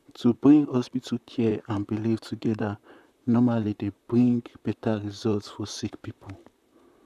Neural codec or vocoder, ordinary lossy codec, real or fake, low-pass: vocoder, 44.1 kHz, 128 mel bands, Pupu-Vocoder; none; fake; 14.4 kHz